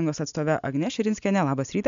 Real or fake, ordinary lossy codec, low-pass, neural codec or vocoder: real; MP3, 64 kbps; 7.2 kHz; none